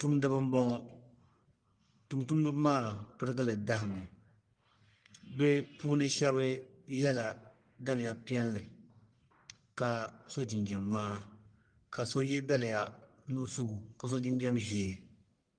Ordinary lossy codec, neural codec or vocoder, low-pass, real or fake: Opus, 32 kbps; codec, 44.1 kHz, 1.7 kbps, Pupu-Codec; 9.9 kHz; fake